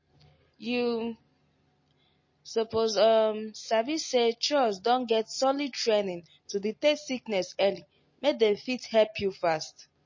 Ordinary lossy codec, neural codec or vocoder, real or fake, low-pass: MP3, 32 kbps; none; real; 7.2 kHz